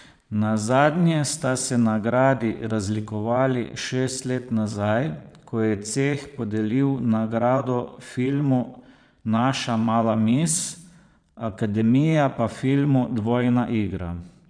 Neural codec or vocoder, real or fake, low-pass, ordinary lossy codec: vocoder, 22.05 kHz, 80 mel bands, Vocos; fake; 9.9 kHz; none